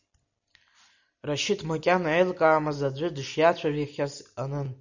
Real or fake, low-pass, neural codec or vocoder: real; 7.2 kHz; none